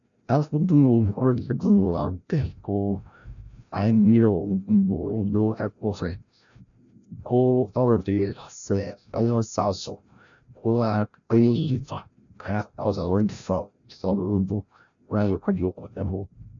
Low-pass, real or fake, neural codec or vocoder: 7.2 kHz; fake; codec, 16 kHz, 0.5 kbps, FreqCodec, larger model